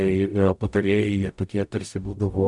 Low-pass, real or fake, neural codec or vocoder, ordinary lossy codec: 10.8 kHz; fake; codec, 44.1 kHz, 0.9 kbps, DAC; MP3, 96 kbps